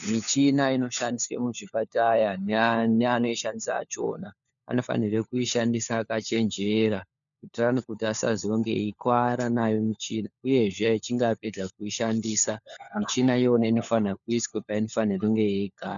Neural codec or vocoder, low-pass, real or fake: codec, 16 kHz, 4 kbps, FunCodec, trained on LibriTTS, 50 frames a second; 7.2 kHz; fake